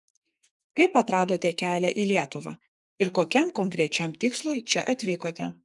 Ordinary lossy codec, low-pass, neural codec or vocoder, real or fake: MP3, 96 kbps; 10.8 kHz; codec, 44.1 kHz, 2.6 kbps, SNAC; fake